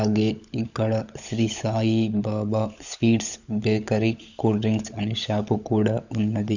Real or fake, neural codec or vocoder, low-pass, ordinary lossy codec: fake; codec, 16 kHz, 16 kbps, FunCodec, trained on LibriTTS, 50 frames a second; 7.2 kHz; none